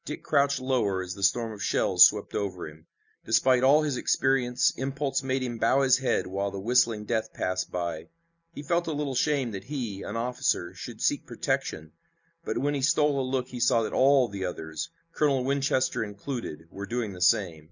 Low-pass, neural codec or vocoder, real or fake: 7.2 kHz; none; real